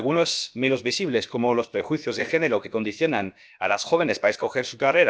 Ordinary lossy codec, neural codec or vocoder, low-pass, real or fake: none; codec, 16 kHz, about 1 kbps, DyCAST, with the encoder's durations; none; fake